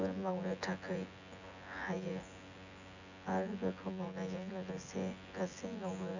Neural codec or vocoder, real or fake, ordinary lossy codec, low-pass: vocoder, 24 kHz, 100 mel bands, Vocos; fake; none; 7.2 kHz